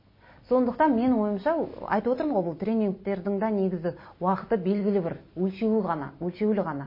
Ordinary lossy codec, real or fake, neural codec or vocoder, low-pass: MP3, 24 kbps; real; none; 5.4 kHz